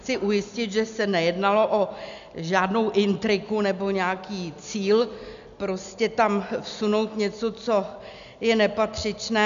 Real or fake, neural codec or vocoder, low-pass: real; none; 7.2 kHz